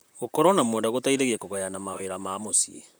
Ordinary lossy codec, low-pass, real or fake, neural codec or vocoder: none; none; fake; vocoder, 44.1 kHz, 128 mel bands, Pupu-Vocoder